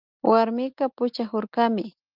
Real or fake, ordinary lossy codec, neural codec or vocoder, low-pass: real; Opus, 24 kbps; none; 5.4 kHz